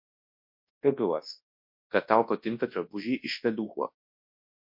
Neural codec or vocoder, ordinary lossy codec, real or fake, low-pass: codec, 24 kHz, 0.9 kbps, WavTokenizer, large speech release; MP3, 32 kbps; fake; 5.4 kHz